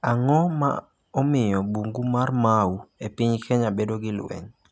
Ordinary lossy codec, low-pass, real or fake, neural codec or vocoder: none; none; real; none